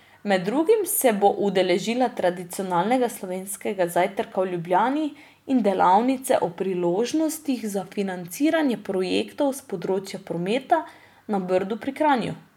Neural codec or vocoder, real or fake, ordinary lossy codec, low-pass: none; real; none; 19.8 kHz